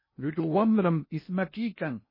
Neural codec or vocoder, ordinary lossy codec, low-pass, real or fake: codec, 16 kHz in and 24 kHz out, 0.6 kbps, FocalCodec, streaming, 2048 codes; MP3, 24 kbps; 5.4 kHz; fake